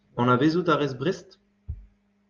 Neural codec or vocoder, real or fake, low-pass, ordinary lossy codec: none; real; 7.2 kHz; Opus, 24 kbps